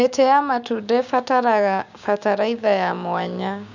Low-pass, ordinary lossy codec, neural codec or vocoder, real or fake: 7.2 kHz; none; codec, 16 kHz, 6 kbps, DAC; fake